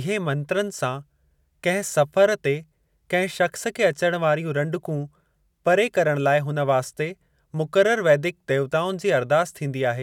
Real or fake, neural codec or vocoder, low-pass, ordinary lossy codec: real; none; 19.8 kHz; none